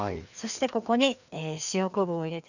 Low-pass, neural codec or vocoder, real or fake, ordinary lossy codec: 7.2 kHz; codec, 16 kHz, 2 kbps, FreqCodec, larger model; fake; none